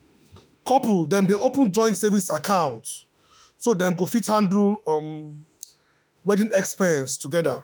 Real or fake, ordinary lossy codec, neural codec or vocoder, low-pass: fake; none; autoencoder, 48 kHz, 32 numbers a frame, DAC-VAE, trained on Japanese speech; none